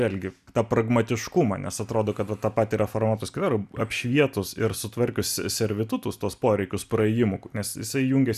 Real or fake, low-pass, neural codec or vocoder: real; 14.4 kHz; none